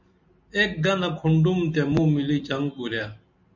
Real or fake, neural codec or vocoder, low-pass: real; none; 7.2 kHz